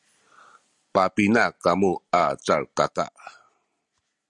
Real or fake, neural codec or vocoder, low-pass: real; none; 10.8 kHz